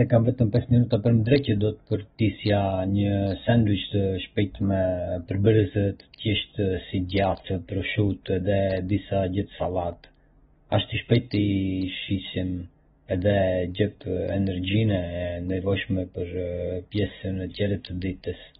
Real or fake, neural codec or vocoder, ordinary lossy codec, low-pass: real; none; AAC, 16 kbps; 7.2 kHz